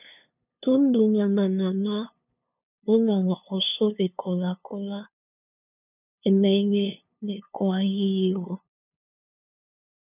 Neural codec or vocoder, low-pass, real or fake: codec, 16 kHz, 2 kbps, FunCodec, trained on LibriTTS, 25 frames a second; 3.6 kHz; fake